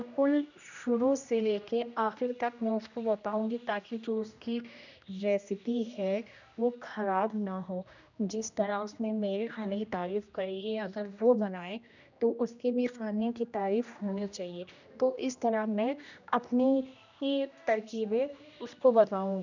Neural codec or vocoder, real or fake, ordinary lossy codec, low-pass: codec, 16 kHz, 1 kbps, X-Codec, HuBERT features, trained on general audio; fake; none; 7.2 kHz